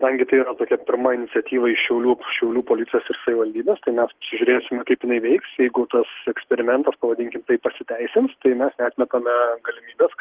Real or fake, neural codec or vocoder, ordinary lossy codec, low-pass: real; none; Opus, 16 kbps; 3.6 kHz